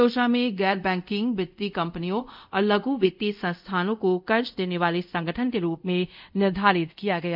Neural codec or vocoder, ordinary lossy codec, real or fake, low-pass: codec, 24 kHz, 0.5 kbps, DualCodec; none; fake; 5.4 kHz